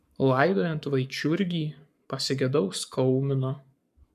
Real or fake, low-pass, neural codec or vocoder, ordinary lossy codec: fake; 14.4 kHz; codec, 44.1 kHz, 7.8 kbps, Pupu-Codec; MP3, 96 kbps